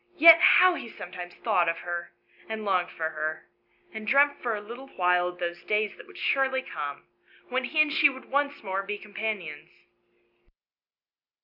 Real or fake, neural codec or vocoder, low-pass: real; none; 5.4 kHz